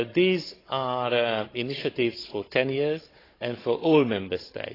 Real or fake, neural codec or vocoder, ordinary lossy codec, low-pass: fake; codec, 16 kHz, 16 kbps, FunCodec, trained on Chinese and English, 50 frames a second; AAC, 24 kbps; 5.4 kHz